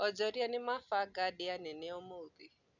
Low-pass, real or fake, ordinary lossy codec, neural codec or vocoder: 7.2 kHz; real; none; none